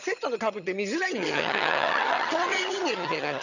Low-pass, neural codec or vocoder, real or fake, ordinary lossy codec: 7.2 kHz; vocoder, 22.05 kHz, 80 mel bands, HiFi-GAN; fake; none